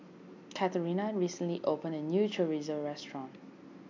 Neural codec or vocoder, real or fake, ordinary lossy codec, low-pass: none; real; MP3, 64 kbps; 7.2 kHz